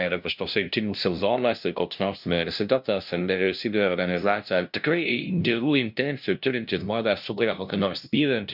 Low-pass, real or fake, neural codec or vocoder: 5.4 kHz; fake; codec, 16 kHz, 0.5 kbps, FunCodec, trained on LibriTTS, 25 frames a second